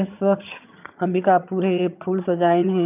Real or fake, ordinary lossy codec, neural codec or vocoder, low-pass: fake; none; vocoder, 22.05 kHz, 80 mel bands, HiFi-GAN; 3.6 kHz